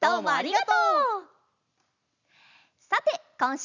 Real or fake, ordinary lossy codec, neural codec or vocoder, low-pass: real; none; none; 7.2 kHz